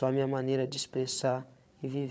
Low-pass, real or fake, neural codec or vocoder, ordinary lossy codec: none; fake; codec, 16 kHz, 8 kbps, FreqCodec, larger model; none